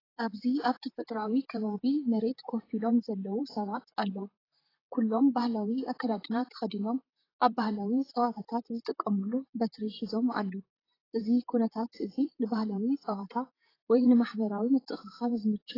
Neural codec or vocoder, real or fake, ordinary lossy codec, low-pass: vocoder, 44.1 kHz, 128 mel bands, Pupu-Vocoder; fake; AAC, 24 kbps; 5.4 kHz